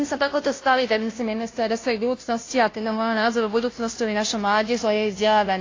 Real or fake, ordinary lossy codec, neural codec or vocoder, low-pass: fake; AAC, 32 kbps; codec, 16 kHz, 0.5 kbps, FunCodec, trained on Chinese and English, 25 frames a second; 7.2 kHz